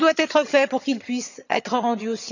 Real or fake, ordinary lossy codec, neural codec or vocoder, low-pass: fake; none; vocoder, 22.05 kHz, 80 mel bands, HiFi-GAN; 7.2 kHz